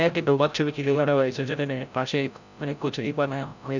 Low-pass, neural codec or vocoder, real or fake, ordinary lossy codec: 7.2 kHz; codec, 16 kHz, 0.5 kbps, FreqCodec, larger model; fake; none